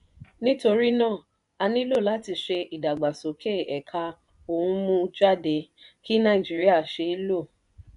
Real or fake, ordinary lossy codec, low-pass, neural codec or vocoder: fake; none; 10.8 kHz; vocoder, 24 kHz, 100 mel bands, Vocos